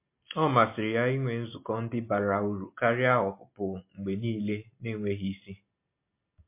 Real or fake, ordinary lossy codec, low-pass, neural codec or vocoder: real; MP3, 24 kbps; 3.6 kHz; none